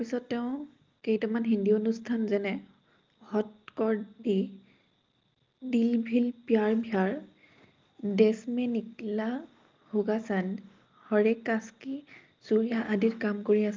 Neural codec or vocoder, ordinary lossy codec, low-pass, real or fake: none; Opus, 32 kbps; 7.2 kHz; real